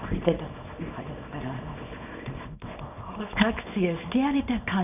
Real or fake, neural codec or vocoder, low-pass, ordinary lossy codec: fake; codec, 24 kHz, 0.9 kbps, WavTokenizer, small release; 3.6 kHz; none